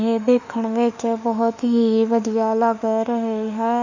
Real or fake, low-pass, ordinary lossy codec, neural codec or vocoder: fake; 7.2 kHz; none; autoencoder, 48 kHz, 32 numbers a frame, DAC-VAE, trained on Japanese speech